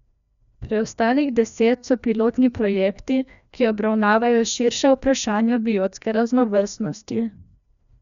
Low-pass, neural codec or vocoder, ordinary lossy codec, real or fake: 7.2 kHz; codec, 16 kHz, 1 kbps, FreqCodec, larger model; none; fake